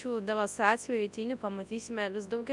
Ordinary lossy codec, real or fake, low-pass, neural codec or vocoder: MP3, 96 kbps; fake; 10.8 kHz; codec, 24 kHz, 0.9 kbps, WavTokenizer, large speech release